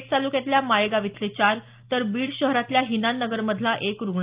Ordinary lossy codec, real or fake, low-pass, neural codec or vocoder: Opus, 32 kbps; real; 3.6 kHz; none